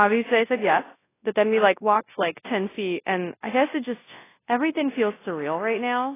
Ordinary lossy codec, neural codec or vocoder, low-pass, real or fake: AAC, 16 kbps; codec, 24 kHz, 0.9 kbps, WavTokenizer, large speech release; 3.6 kHz; fake